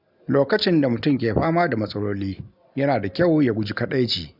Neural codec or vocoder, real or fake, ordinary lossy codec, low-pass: none; real; none; 5.4 kHz